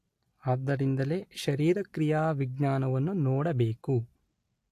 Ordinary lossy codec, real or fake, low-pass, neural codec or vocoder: AAC, 64 kbps; real; 14.4 kHz; none